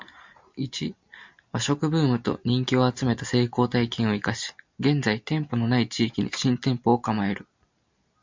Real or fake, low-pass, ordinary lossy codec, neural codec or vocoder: real; 7.2 kHz; MP3, 64 kbps; none